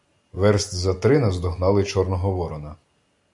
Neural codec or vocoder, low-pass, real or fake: none; 10.8 kHz; real